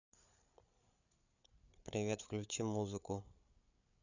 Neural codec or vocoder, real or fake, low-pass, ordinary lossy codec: codec, 16 kHz, 8 kbps, FreqCodec, larger model; fake; 7.2 kHz; none